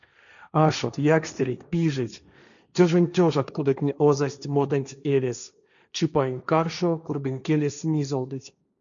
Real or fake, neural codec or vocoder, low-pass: fake; codec, 16 kHz, 1.1 kbps, Voila-Tokenizer; 7.2 kHz